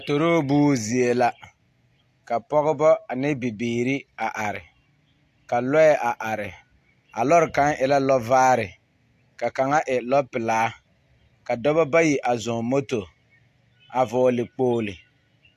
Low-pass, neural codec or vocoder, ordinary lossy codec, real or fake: 14.4 kHz; none; AAC, 64 kbps; real